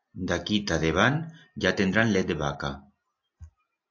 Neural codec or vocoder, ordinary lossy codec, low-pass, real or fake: none; AAC, 48 kbps; 7.2 kHz; real